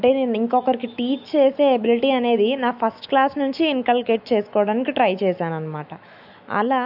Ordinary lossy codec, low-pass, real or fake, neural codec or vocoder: AAC, 48 kbps; 5.4 kHz; fake; autoencoder, 48 kHz, 128 numbers a frame, DAC-VAE, trained on Japanese speech